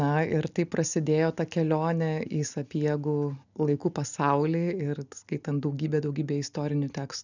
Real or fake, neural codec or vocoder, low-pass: real; none; 7.2 kHz